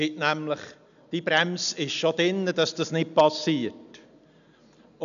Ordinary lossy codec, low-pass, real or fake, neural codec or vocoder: none; 7.2 kHz; real; none